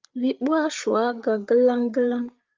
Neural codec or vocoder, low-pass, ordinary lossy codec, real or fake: codec, 16 kHz, 8 kbps, FreqCodec, larger model; 7.2 kHz; Opus, 24 kbps; fake